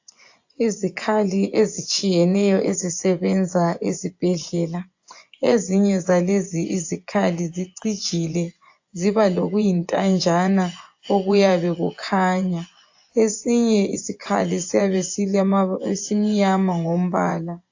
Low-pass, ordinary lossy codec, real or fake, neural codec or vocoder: 7.2 kHz; AAC, 48 kbps; fake; vocoder, 24 kHz, 100 mel bands, Vocos